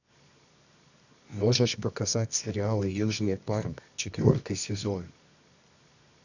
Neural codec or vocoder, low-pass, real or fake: codec, 24 kHz, 0.9 kbps, WavTokenizer, medium music audio release; 7.2 kHz; fake